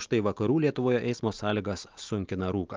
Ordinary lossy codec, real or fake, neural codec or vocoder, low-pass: Opus, 24 kbps; real; none; 7.2 kHz